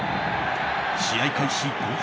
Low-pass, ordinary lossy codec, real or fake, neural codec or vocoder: none; none; real; none